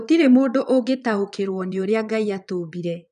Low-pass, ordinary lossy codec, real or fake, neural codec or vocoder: 9.9 kHz; none; fake; vocoder, 22.05 kHz, 80 mel bands, Vocos